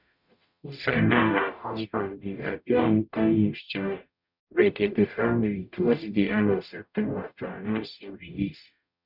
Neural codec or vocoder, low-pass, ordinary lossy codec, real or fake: codec, 44.1 kHz, 0.9 kbps, DAC; 5.4 kHz; none; fake